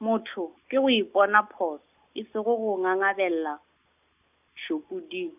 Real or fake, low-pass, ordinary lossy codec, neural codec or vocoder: real; 3.6 kHz; none; none